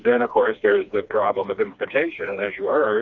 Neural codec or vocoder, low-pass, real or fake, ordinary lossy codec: codec, 16 kHz, 2 kbps, FreqCodec, smaller model; 7.2 kHz; fake; Opus, 64 kbps